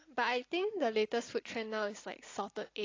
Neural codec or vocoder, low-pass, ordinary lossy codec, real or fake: none; 7.2 kHz; AAC, 32 kbps; real